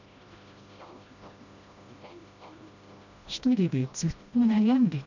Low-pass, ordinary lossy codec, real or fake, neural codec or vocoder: 7.2 kHz; none; fake; codec, 16 kHz, 1 kbps, FreqCodec, smaller model